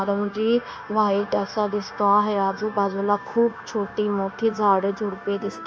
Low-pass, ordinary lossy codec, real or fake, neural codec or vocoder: none; none; fake; codec, 16 kHz, 0.9 kbps, LongCat-Audio-Codec